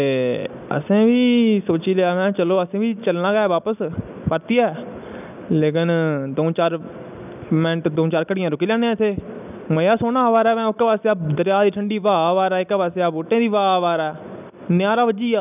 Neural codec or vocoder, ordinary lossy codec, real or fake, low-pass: none; none; real; 3.6 kHz